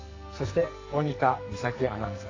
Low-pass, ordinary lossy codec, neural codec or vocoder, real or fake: 7.2 kHz; AAC, 32 kbps; codec, 44.1 kHz, 2.6 kbps, SNAC; fake